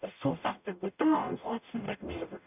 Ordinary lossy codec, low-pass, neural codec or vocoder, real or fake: none; 3.6 kHz; codec, 44.1 kHz, 0.9 kbps, DAC; fake